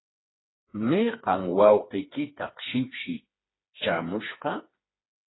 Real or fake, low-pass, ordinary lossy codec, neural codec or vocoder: fake; 7.2 kHz; AAC, 16 kbps; codec, 16 kHz, 4 kbps, FreqCodec, smaller model